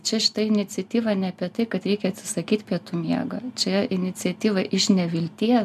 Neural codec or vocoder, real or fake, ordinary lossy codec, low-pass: none; real; MP3, 96 kbps; 14.4 kHz